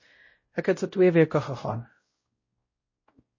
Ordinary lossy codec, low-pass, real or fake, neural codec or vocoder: MP3, 32 kbps; 7.2 kHz; fake; codec, 16 kHz, 0.5 kbps, X-Codec, WavLM features, trained on Multilingual LibriSpeech